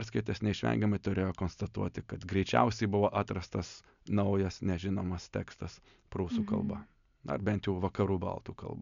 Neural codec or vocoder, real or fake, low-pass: none; real; 7.2 kHz